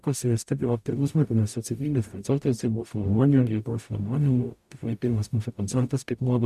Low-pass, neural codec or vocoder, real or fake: 14.4 kHz; codec, 44.1 kHz, 0.9 kbps, DAC; fake